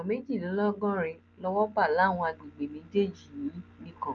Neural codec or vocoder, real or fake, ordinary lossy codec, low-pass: none; real; Opus, 24 kbps; 7.2 kHz